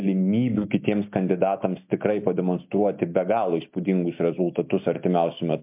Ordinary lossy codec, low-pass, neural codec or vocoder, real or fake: MP3, 32 kbps; 3.6 kHz; none; real